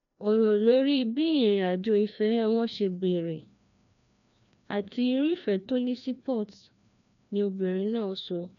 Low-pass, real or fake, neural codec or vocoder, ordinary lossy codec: 7.2 kHz; fake; codec, 16 kHz, 1 kbps, FreqCodec, larger model; none